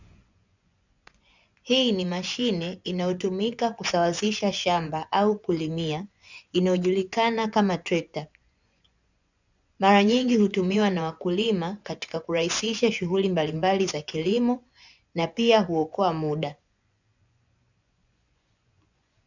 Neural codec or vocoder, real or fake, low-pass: vocoder, 24 kHz, 100 mel bands, Vocos; fake; 7.2 kHz